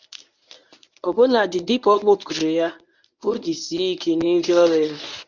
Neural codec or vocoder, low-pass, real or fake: codec, 24 kHz, 0.9 kbps, WavTokenizer, medium speech release version 1; 7.2 kHz; fake